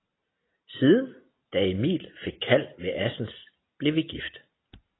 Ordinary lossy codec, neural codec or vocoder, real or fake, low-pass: AAC, 16 kbps; none; real; 7.2 kHz